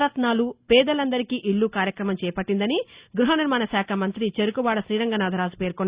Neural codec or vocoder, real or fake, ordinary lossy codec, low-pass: none; real; Opus, 64 kbps; 3.6 kHz